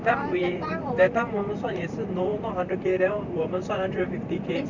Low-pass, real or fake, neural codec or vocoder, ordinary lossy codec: 7.2 kHz; fake; vocoder, 44.1 kHz, 128 mel bands, Pupu-Vocoder; none